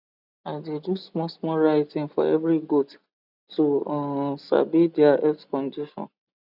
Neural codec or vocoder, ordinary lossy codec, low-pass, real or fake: none; none; 5.4 kHz; real